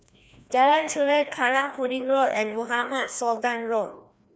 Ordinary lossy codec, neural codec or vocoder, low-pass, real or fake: none; codec, 16 kHz, 1 kbps, FreqCodec, larger model; none; fake